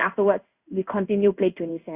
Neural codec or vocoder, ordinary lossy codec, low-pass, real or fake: codec, 16 kHz in and 24 kHz out, 1 kbps, XY-Tokenizer; Opus, 64 kbps; 3.6 kHz; fake